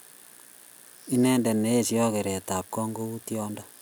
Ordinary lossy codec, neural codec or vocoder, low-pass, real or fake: none; none; none; real